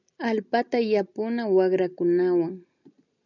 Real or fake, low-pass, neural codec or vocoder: real; 7.2 kHz; none